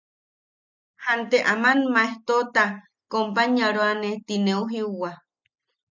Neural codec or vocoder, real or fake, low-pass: none; real; 7.2 kHz